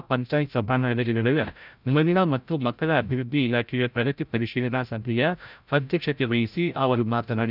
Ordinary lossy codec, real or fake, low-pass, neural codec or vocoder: none; fake; 5.4 kHz; codec, 16 kHz, 0.5 kbps, FreqCodec, larger model